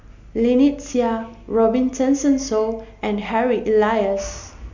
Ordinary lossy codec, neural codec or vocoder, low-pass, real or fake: Opus, 64 kbps; none; 7.2 kHz; real